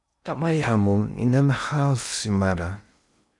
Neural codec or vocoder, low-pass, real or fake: codec, 16 kHz in and 24 kHz out, 0.6 kbps, FocalCodec, streaming, 2048 codes; 10.8 kHz; fake